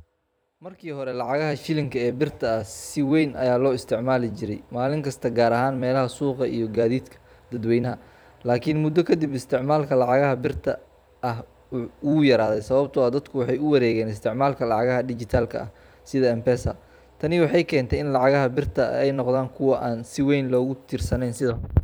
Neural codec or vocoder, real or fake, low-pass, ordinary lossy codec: vocoder, 44.1 kHz, 128 mel bands every 256 samples, BigVGAN v2; fake; none; none